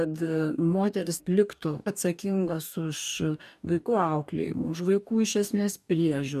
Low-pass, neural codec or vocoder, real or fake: 14.4 kHz; codec, 44.1 kHz, 2.6 kbps, DAC; fake